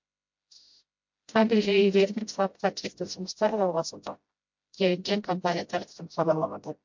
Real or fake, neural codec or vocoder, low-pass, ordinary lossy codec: fake; codec, 16 kHz, 0.5 kbps, FreqCodec, smaller model; 7.2 kHz; MP3, 48 kbps